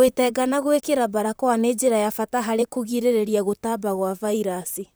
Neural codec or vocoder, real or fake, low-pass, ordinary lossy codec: vocoder, 44.1 kHz, 128 mel bands, Pupu-Vocoder; fake; none; none